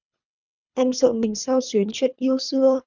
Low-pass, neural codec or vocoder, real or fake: 7.2 kHz; codec, 24 kHz, 3 kbps, HILCodec; fake